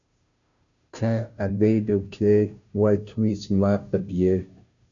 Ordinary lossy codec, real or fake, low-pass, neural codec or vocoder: AAC, 64 kbps; fake; 7.2 kHz; codec, 16 kHz, 0.5 kbps, FunCodec, trained on Chinese and English, 25 frames a second